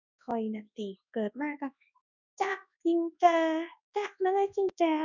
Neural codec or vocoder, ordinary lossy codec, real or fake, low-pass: codec, 24 kHz, 0.9 kbps, WavTokenizer, large speech release; none; fake; 7.2 kHz